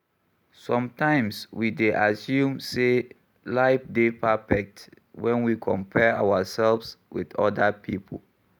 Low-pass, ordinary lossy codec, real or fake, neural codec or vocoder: 19.8 kHz; none; real; none